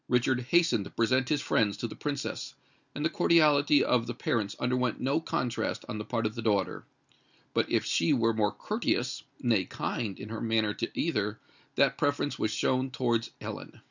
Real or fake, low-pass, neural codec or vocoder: real; 7.2 kHz; none